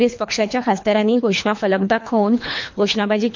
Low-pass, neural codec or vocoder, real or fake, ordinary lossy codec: 7.2 kHz; codec, 24 kHz, 3 kbps, HILCodec; fake; MP3, 48 kbps